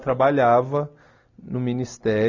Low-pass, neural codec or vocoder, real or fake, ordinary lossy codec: 7.2 kHz; none; real; none